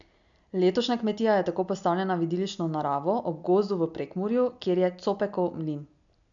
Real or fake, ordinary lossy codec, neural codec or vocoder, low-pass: real; none; none; 7.2 kHz